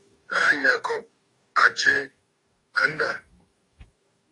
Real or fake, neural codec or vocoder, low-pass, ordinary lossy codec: fake; codec, 44.1 kHz, 2.6 kbps, DAC; 10.8 kHz; AAC, 48 kbps